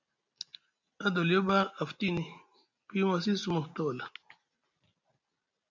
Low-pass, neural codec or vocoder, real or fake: 7.2 kHz; none; real